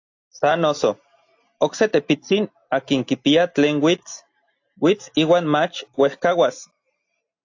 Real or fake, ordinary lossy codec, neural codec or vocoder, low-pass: real; AAC, 48 kbps; none; 7.2 kHz